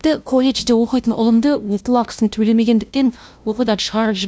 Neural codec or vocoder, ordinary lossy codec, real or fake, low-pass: codec, 16 kHz, 0.5 kbps, FunCodec, trained on LibriTTS, 25 frames a second; none; fake; none